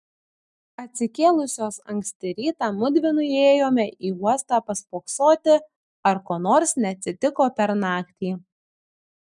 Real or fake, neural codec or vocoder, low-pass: real; none; 10.8 kHz